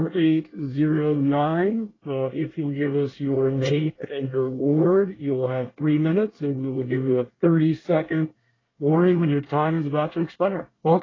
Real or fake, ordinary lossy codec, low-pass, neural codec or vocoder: fake; AAC, 32 kbps; 7.2 kHz; codec, 24 kHz, 1 kbps, SNAC